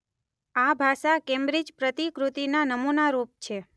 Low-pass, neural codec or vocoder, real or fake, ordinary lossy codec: none; none; real; none